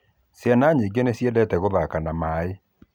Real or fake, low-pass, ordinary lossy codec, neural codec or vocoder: real; 19.8 kHz; none; none